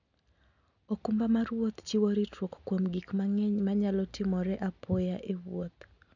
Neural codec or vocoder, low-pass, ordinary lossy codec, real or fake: none; 7.2 kHz; none; real